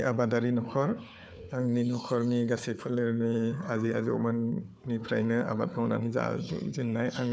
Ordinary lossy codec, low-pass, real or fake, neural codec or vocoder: none; none; fake; codec, 16 kHz, 4 kbps, FunCodec, trained on Chinese and English, 50 frames a second